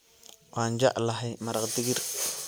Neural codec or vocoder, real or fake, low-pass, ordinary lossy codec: none; real; none; none